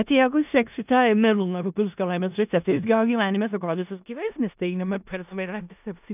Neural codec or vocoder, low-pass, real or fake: codec, 16 kHz in and 24 kHz out, 0.4 kbps, LongCat-Audio-Codec, four codebook decoder; 3.6 kHz; fake